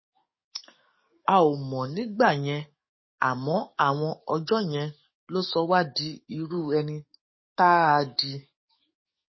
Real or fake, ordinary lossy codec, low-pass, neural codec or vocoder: fake; MP3, 24 kbps; 7.2 kHz; codec, 44.1 kHz, 7.8 kbps, DAC